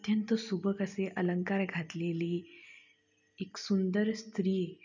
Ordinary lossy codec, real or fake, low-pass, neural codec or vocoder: none; real; 7.2 kHz; none